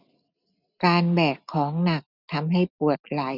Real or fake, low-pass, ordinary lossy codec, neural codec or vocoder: real; 5.4 kHz; none; none